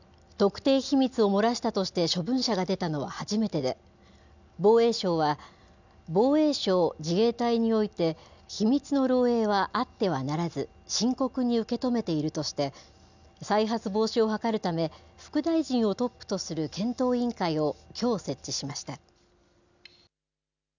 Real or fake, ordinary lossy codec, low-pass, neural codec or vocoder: real; none; 7.2 kHz; none